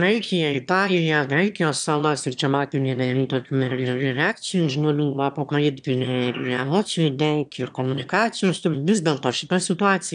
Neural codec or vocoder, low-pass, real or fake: autoencoder, 22.05 kHz, a latent of 192 numbers a frame, VITS, trained on one speaker; 9.9 kHz; fake